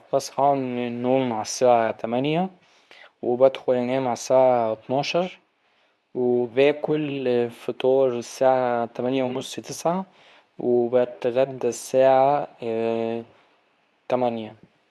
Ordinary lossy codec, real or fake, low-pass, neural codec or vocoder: none; fake; none; codec, 24 kHz, 0.9 kbps, WavTokenizer, medium speech release version 2